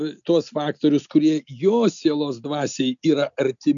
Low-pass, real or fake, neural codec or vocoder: 7.2 kHz; real; none